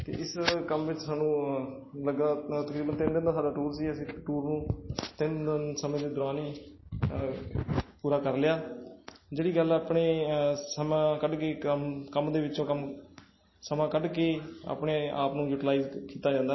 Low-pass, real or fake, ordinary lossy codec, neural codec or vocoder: 7.2 kHz; real; MP3, 24 kbps; none